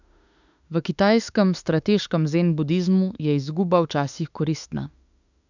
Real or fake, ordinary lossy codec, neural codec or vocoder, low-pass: fake; none; autoencoder, 48 kHz, 32 numbers a frame, DAC-VAE, trained on Japanese speech; 7.2 kHz